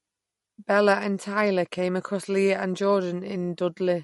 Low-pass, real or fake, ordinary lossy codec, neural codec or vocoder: 19.8 kHz; real; MP3, 48 kbps; none